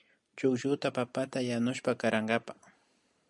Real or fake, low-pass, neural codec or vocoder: real; 10.8 kHz; none